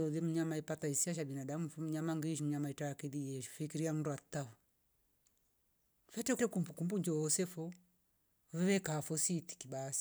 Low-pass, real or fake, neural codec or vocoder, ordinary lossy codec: none; real; none; none